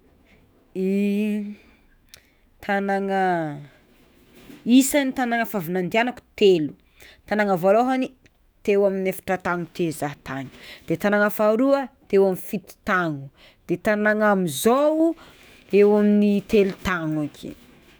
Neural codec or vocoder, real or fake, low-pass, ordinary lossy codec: autoencoder, 48 kHz, 128 numbers a frame, DAC-VAE, trained on Japanese speech; fake; none; none